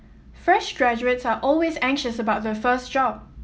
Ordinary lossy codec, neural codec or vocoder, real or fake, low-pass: none; none; real; none